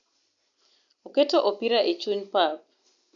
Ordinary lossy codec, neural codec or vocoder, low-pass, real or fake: none; none; 7.2 kHz; real